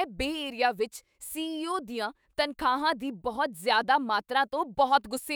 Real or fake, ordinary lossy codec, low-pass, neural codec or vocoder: fake; none; none; autoencoder, 48 kHz, 128 numbers a frame, DAC-VAE, trained on Japanese speech